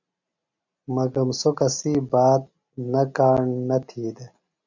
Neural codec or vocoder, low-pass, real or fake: none; 7.2 kHz; real